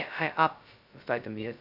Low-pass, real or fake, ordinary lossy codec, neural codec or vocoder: 5.4 kHz; fake; none; codec, 16 kHz, 0.2 kbps, FocalCodec